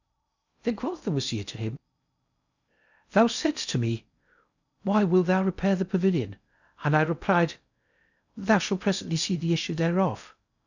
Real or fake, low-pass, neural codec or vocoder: fake; 7.2 kHz; codec, 16 kHz in and 24 kHz out, 0.6 kbps, FocalCodec, streaming, 2048 codes